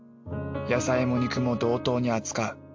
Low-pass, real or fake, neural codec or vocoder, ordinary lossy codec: 7.2 kHz; real; none; MP3, 48 kbps